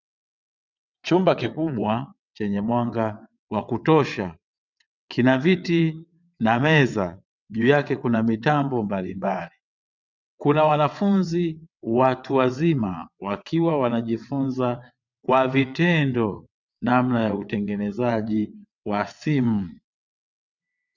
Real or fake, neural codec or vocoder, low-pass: fake; vocoder, 22.05 kHz, 80 mel bands, WaveNeXt; 7.2 kHz